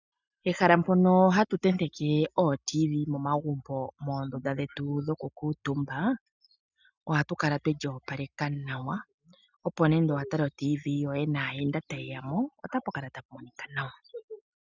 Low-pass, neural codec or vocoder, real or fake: 7.2 kHz; none; real